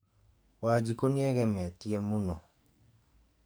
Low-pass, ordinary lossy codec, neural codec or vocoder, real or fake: none; none; codec, 44.1 kHz, 2.6 kbps, SNAC; fake